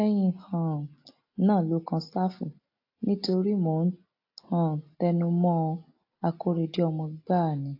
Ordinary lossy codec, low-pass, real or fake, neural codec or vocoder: AAC, 32 kbps; 5.4 kHz; real; none